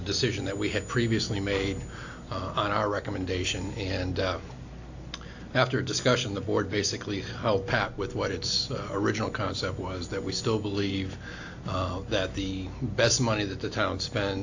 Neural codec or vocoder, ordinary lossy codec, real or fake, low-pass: none; AAC, 48 kbps; real; 7.2 kHz